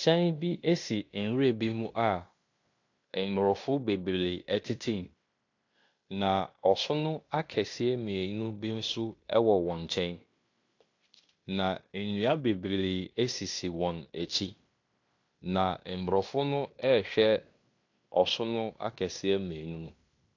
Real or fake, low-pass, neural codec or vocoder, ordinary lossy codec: fake; 7.2 kHz; codec, 16 kHz in and 24 kHz out, 0.9 kbps, LongCat-Audio-Codec, fine tuned four codebook decoder; AAC, 48 kbps